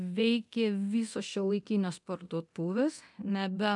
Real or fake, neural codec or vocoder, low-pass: fake; codec, 24 kHz, 0.9 kbps, DualCodec; 10.8 kHz